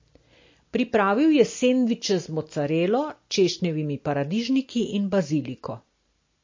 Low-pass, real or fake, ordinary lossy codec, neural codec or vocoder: 7.2 kHz; real; MP3, 32 kbps; none